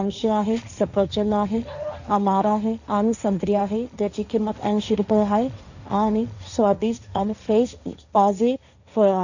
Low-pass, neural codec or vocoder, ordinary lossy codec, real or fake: 7.2 kHz; codec, 16 kHz, 1.1 kbps, Voila-Tokenizer; none; fake